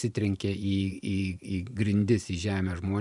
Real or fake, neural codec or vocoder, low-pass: fake; vocoder, 44.1 kHz, 128 mel bands every 512 samples, BigVGAN v2; 10.8 kHz